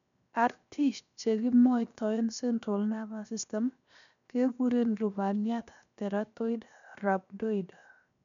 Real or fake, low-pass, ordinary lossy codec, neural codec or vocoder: fake; 7.2 kHz; none; codec, 16 kHz, 0.7 kbps, FocalCodec